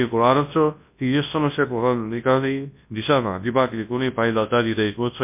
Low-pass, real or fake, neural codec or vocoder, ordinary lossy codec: 3.6 kHz; fake; codec, 24 kHz, 0.9 kbps, WavTokenizer, large speech release; MP3, 32 kbps